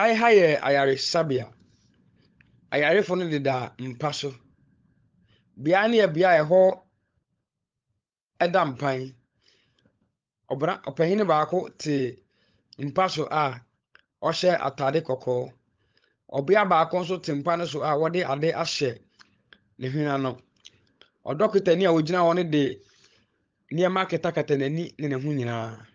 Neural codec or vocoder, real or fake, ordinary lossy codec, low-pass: codec, 16 kHz, 16 kbps, FunCodec, trained on LibriTTS, 50 frames a second; fake; Opus, 24 kbps; 7.2 kHz